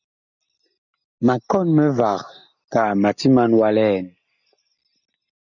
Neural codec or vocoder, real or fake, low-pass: none; real; 7.2 kHz